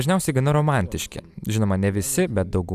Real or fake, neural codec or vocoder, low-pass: real; none; 14.4 kHz